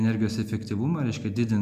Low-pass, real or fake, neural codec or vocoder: 14.4 kHz; real; none